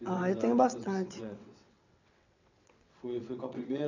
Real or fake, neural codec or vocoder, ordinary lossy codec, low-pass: fake; vocoder, 22.05 kHz, 80 mel bands, WaveNeXt; none; 7.2 kHz